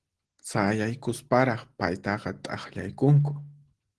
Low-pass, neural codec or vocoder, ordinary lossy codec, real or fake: 10.8 kHz; none; Opus, 16 kbps; real